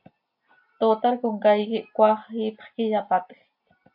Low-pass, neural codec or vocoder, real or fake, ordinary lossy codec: 5.4 kHz; none; real; AAC, 48 kbps